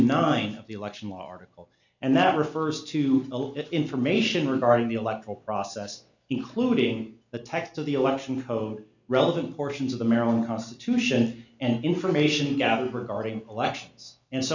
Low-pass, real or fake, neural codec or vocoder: 7.2 kHz; real; none